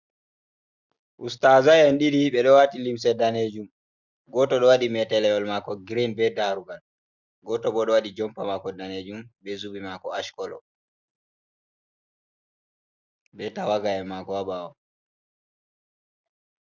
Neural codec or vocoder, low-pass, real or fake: none; 7.2 kHz; real